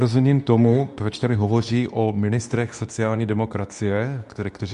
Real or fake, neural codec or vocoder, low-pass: fake; codec, 24 kHz, 0.9 kbps, WavTokenizer, medium speech release version 2; 10.8 kHz